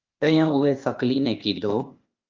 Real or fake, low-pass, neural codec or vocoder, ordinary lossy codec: fake; 7.2 kHz; codec, 16 kHz, 0.8 kbps, ZipCodec; Opus, 24 kbps